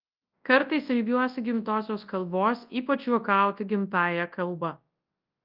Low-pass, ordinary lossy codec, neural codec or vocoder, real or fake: 5.4 kHz; Opus, 24 kbps; codec, 24 kHz, 0.9 kbps, WavTokenizer, large speech release; fake